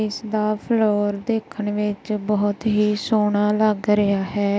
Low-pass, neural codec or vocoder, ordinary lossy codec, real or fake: none; none; none; real